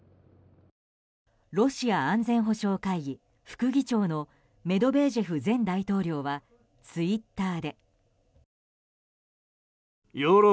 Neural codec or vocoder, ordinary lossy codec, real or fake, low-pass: none; none; real; none